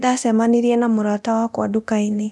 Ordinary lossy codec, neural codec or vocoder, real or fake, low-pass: none; codec, 24 kHz, 0.9 kbps, DualCodec; fake; none